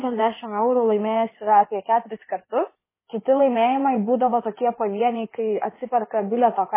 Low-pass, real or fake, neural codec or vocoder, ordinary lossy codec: 3.6 kHz; fake; codec, 16 kHz in and 24 kHz out, 2.2 kbps, FireRedTTS-2 codec; MP3, 16 kbps